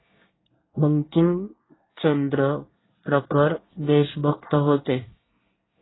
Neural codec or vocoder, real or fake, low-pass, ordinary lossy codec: codec, 24 kHz, 1 kbps, SNAC; fake; 7.2 kHz; AAC, 16 kbps